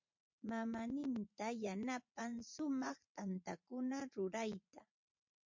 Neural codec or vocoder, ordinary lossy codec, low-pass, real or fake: vocoder, 44.1 kHz, 80 mel bands, Vocos; MP3, 48 kbps; 7.2 kHz; fake